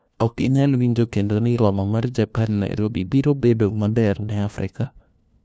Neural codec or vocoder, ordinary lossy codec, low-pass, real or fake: codec, 16 kHz, 1 kbps, FunCodec, trained on LibriTTS, 50 frames a second; none; none; fake